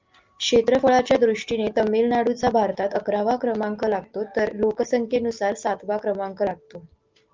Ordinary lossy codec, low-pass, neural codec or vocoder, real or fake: Opus, 32 kbps; 7.2 kHz; none; real